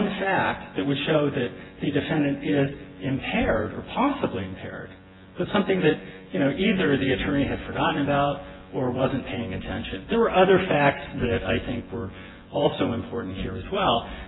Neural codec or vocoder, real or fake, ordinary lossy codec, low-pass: vocoder, 24 kHz, 100 mel bands, Vocos; fake; AAC, 16 kbps; 7.2 kHz